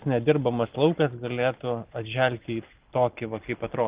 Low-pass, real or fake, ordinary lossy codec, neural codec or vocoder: 3.6 kHz; real; Opus, 32 kbps; none